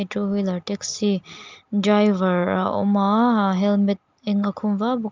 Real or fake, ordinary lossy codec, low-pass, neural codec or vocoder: real; Opus, 32 kbps; 7.2 kHz; none